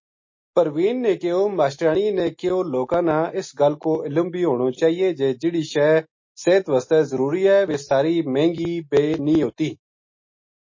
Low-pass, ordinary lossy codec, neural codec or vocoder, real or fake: 7.2 kHz; MP3, 32 kbps; none; real